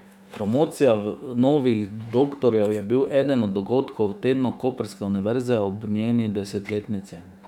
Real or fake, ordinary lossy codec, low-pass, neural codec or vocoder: fake; none; 19.8 kHz; autoencoder, 48 kHz, 32 numbers a frame, DAC-VAE, trained on Japanese speech